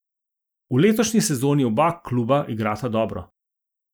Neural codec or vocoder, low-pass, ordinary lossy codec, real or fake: none; none; none; real